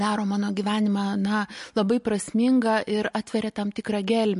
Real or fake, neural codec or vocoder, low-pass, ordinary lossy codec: real; none; 14.4 kHz; MP3, 48 kbps